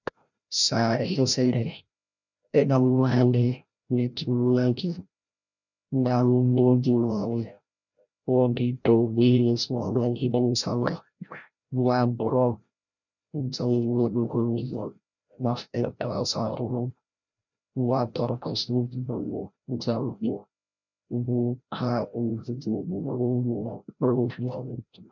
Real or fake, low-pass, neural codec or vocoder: fake; 7.2 kHz; codec, 16 kHz, 0.5 kbps, FreqCodec, larger model